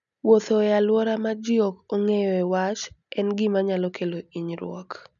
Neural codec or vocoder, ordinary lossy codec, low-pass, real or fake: none; none; 7.2 kHz; real